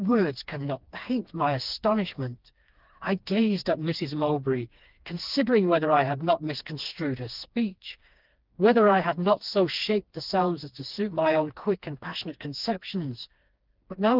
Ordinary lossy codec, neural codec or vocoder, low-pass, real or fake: Opus, 32 kbps; codec, 16 kHz, 2 kbps, FreqCodec, smaller model; 5.4 kHz; fake